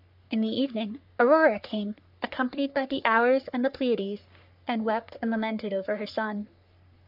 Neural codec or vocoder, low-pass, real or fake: codec, 44.1 kHz, 3.4 kbps, Pupu-Codec; 5.4 kHz; fake